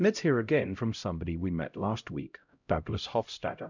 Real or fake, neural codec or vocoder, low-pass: fake; codec, 16 kHz, 0.5 kbps, X-Codec, HuBERT features, trained on LibriSpeech; 7.2 kHz